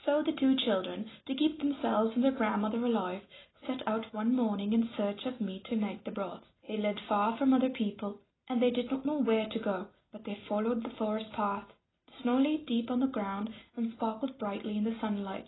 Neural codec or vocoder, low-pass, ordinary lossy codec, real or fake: none; 7.2 kHz; AAC, 16 kbps; real